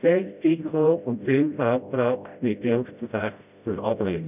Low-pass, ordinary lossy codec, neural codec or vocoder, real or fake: 3.6 kHz; none; codec, 16 kHz, 0.5 kbps, FreqCodec, smaller model; fake